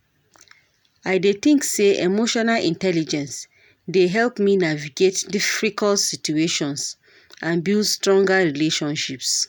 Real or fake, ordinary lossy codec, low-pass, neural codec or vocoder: real; none; none; none